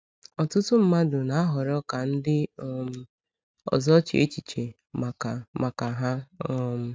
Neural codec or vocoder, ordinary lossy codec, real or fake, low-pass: none; none; real; none